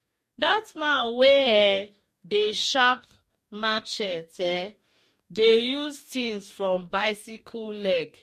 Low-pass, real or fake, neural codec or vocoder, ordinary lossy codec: 14.4 kHz; fake; codec, 44.1 kHz, 2.6 kbps, DAC; MP3, 64 kbps